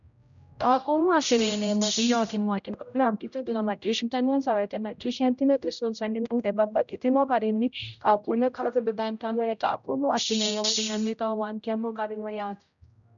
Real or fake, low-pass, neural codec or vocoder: fake; 7.2 kHz; codec, 16 kHz, 0.5 kbps, X-Codec, HuBERT features, trained on general audio